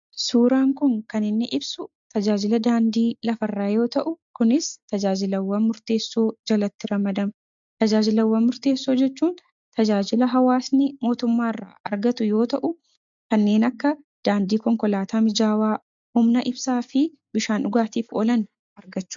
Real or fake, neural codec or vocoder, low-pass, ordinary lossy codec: real; none; 7.2 kHz; MP3, 64 kbps